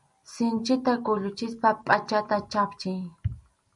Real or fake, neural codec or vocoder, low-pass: real; none; 10.8 kHz